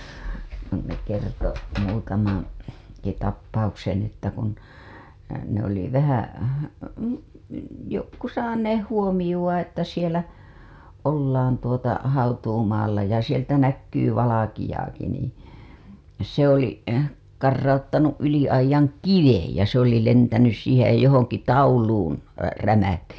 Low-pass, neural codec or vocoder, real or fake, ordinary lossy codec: none; none; real; none